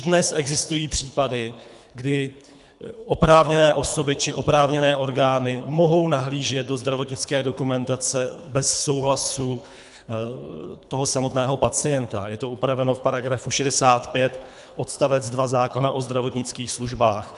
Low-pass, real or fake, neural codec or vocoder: 10.8 kHz; fake; codec, 24 kHz, 3 kbps, HILCodec